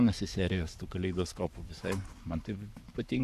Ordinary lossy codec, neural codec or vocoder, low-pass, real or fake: AAC, 96 kbps; codec, 44.1 kHz, 7.8 kbps, Pupu-Codec; 14.4 kHz; fake